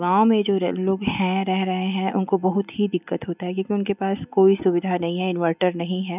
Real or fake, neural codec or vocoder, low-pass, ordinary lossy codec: real; none; 3.6 kHz; none